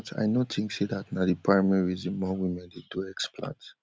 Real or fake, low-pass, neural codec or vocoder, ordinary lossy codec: real; none; none; none